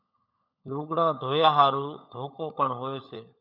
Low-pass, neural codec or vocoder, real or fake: 5.4 kHz; codec, 16 kHz, 16 kbps, FunCodec, trained on LibriTTS, 50 frames a second; fake